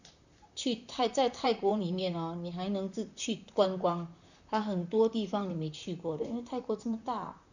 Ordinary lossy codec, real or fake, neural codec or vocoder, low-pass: none; fake; vocoder, 44.1 kHz, 128 mel bands, Pupu-Vocoder; 7.2 kHz